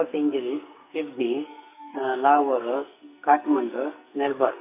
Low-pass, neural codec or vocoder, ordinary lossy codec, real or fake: 3.6 kHz; codec, 44.1 kHz, 2.6 kbps, SNAC; none; fake